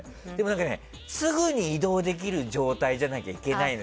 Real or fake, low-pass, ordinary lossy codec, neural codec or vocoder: real; none; none; none